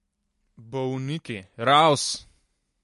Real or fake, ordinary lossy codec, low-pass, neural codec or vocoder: real; MP3, 48 kbps; 14.4 kHz; none